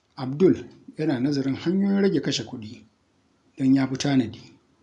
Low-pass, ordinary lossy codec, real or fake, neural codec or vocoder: 10.8 kHz; none; real; none